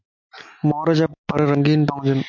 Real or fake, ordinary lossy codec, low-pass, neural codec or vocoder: real; MP3, 64 kbps; 7.2 kHz; none